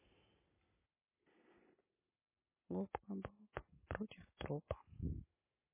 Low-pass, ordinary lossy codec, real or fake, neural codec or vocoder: 3.6 kHz; MP3, 16 kbps; real; none